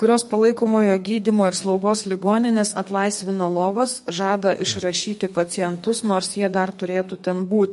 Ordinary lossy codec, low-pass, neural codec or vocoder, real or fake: MP3, 48 kbps; 14.4 kHz; codec, 32 kHz, 1.9 kbps, SNAC; fake